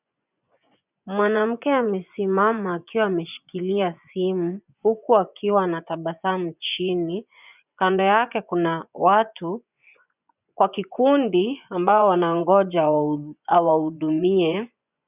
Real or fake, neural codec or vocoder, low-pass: fake; vocoder, 24 kHz, 100 mel bands, Vocos; 3.6 kHz